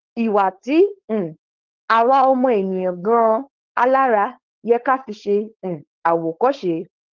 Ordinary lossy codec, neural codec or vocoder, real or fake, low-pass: Opus, 16 kbps; codec, 16 kHz, 4.8 kbps, FACodec; fake; 7.2 kHz